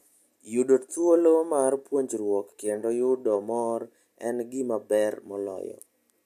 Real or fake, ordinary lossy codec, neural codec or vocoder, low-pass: real; AAC, 96 kbps; none; 14.4 kHz